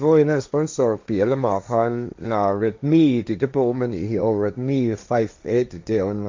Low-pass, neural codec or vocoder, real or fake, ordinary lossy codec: 7.2 kHz; codec, 16 kHz, 1.1 kbps, Voila-Tokenizer; fake; MP3, 64 kbps